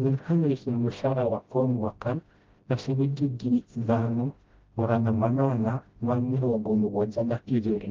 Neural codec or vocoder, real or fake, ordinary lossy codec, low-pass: codec, 16 kHz, 0.5 kbps, FreqCodec, smaller model; fake; Opus, 32 kbps; 7.2 kHz